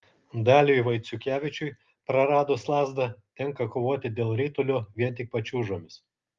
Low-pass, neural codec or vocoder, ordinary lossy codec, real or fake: 7.2 kHz; none; Opus, 32 kbps; real